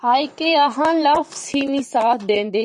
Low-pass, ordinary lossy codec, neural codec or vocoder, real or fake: 10.8 kHz; MP3, 48 kbps; vocoder, 44.1 kHz, 128 mel bands, Pupu-Vocoder; fake